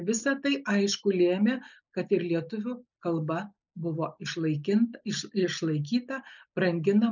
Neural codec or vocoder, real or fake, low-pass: none; real; 7.2 kHz